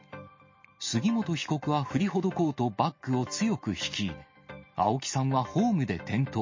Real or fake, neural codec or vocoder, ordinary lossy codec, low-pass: real; none; MP3, 32 kbps; 7.2 kHz